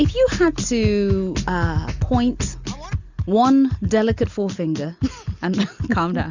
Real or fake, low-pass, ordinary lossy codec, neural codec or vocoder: real; 7.2 kHz; Opus, 64 kbps; none